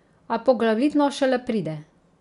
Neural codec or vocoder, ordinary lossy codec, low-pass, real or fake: none; none; 10.8 kHz; real